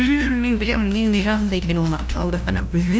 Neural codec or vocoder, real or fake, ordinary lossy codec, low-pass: codec, 16 kHz, 0.5 kbps, FunCodec, trained on LibriTTS, 25 frames a second; fake; none; none